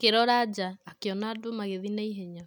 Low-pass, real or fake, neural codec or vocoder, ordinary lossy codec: 19.8 kHz; real; none; none